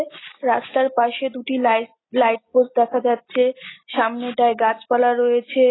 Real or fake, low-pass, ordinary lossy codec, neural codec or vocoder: real; 7.2 kHz; AAC, 16 kbps; none